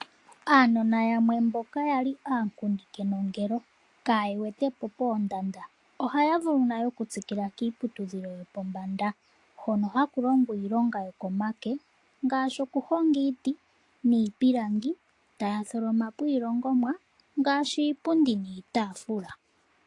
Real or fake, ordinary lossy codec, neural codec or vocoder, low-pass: real; AAC, 48 kbps; none; 10.8 kHz